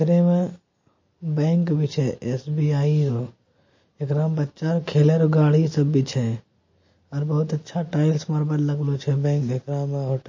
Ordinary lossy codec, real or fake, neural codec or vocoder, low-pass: MP3, 32 kbps; real; none; 7.2 kHz